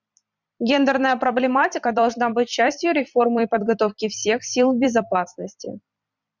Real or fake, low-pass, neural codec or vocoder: fake; 7.2 kHz; vocoder, 44.1 kHz, 128 mel bands every 256 samples, BigVGAN v2